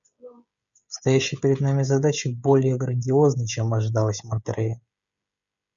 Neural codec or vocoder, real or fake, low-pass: codec, 16 kHz, 16 kbps, FreqCodec, smaller model; fake; 7.2 kHz